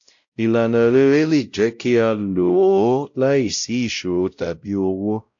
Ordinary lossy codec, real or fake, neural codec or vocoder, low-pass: AAC, 64 kbps; fake; codec, 16 kHz, 0.5 kbps, X-Codec, WavLM features, trained on Multilingual LibriSpeech; 7.2 kHz